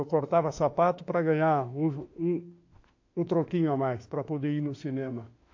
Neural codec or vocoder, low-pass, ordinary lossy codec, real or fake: autoencoder, 48 kHz, 32 numbers a frame, DAC-VAE, trained on Japanese speech; 7.2 kHz; AAC, 48 kbps; fake